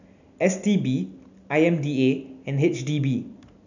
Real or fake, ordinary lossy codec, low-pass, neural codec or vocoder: real; none; 7.2 kHz; none